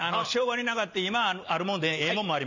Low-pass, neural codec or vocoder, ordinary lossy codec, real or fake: 7.2 kHz; none; none; real